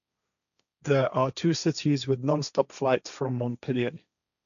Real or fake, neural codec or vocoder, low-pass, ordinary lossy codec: fake; codec, 16 kHz, 1.1 kbps, Voila-Tokenizer; 7.2 kHz; none